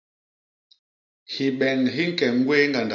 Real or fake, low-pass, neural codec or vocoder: real; 7.2 kHz; none